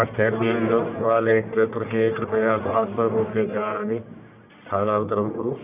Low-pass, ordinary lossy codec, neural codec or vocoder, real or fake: 3.6 kHz; none; codec, 44.1 kHz, 1.7 kbps, Pupu-Codec; fake